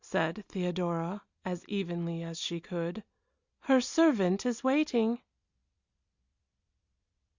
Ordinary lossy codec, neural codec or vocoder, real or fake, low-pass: Opus, 64 kbps; none; real; 7.2 kHz